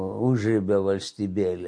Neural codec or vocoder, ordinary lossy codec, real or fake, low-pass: none; MP3, 48 kbps; real; 9.9 kHz